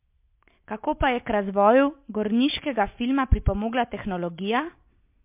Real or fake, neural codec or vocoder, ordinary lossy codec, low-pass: real; none; MP3, 32 kbps; 3.6 kHz